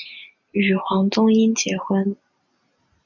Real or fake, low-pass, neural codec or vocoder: real; 7.2 kHz; none